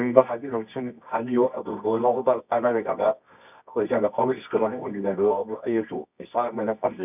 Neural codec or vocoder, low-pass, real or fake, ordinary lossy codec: codec, 24 kHz, 0.9 kbps, WavTokenizer, medium music audio release; 3.6 kHz; fake; none